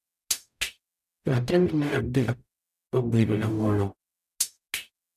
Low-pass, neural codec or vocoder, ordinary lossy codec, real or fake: 14.4 kHz; codec, 44.1 kHz, 0.9 kbps, DAC; none; fake